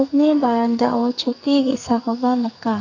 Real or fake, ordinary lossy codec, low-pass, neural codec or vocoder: fake; none; 7.2 kHz; codec, 44.1 kHz, 2.6 kbps, SNAC